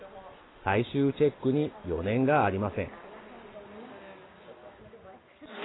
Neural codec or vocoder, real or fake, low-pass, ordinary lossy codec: none; real; 7.2 kHz; AAC, 16 kbps